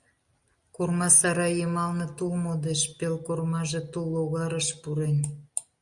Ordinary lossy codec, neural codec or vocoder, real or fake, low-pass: Opus, 64 kbps; none; real; 10.8 kHz